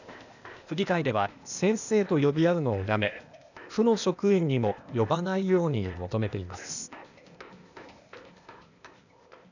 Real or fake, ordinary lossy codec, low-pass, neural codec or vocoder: fake; none; 7.2 kHz; codec, 16 kHz, 0.8 kbps, ZipCodec